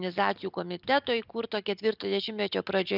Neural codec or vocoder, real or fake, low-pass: none; real; 5.4 kHz